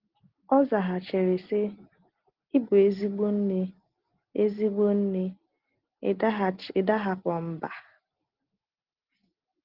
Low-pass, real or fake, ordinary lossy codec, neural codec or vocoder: 5.4 kHz; real; Opus, 16 kbps; none